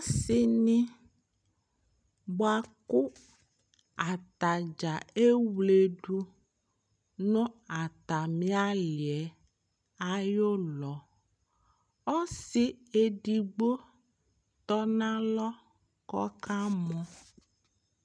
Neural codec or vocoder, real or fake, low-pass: none; real; 9.9 kHz